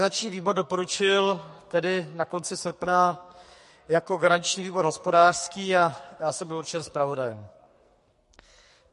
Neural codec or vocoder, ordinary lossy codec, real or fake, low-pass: codec, 44.1 kHz, 2.6 kbps, SNAC; MP3, 48 kbps; fake; 14.4 kHz